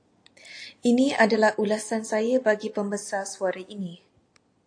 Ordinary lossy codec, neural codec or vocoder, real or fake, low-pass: AAC, 48 kbps; none; real; 9.9 kHz